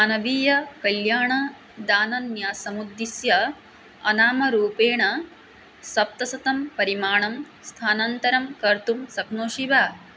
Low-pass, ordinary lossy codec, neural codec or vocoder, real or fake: none; none; none; real